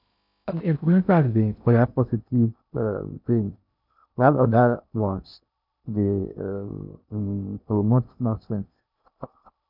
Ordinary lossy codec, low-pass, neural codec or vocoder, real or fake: none; 5.4 kHz; codec, 16 kHz in and 24 kHz out, 0.6 kbps, FocalCodec, streaming, 2048 codes; fake